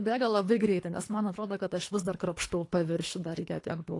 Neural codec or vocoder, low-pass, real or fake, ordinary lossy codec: codec, 24 kHz, 3 kbps, HILCodec; 10.8 kHz; fake; AAC, 48 kbps